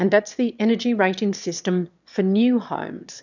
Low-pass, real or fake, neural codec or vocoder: 7.2 kHz; fake; autoencoder, 22.05 kHz, a latent of 192 numbers a frame, VITS, trained on one speaker